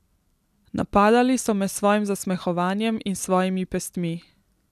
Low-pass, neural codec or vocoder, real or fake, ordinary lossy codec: 14.4 kHz; none; real; none